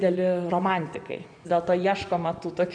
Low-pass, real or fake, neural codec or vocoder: 9.9 kHz; fake; vocoder, 24 kHz, 100 mel bands, Vocos